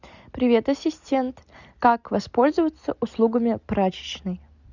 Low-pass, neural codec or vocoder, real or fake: 7.2 kHz; none; real